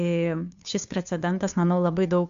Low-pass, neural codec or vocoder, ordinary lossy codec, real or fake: 7.2 kHz; codec, 16 kHz, 2 kbps, FunCodec, trained on Chinese and English, 25 frames a second; MP3, 64 kbps; fake